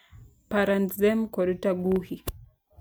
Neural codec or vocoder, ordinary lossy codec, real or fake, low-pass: none; none; real; none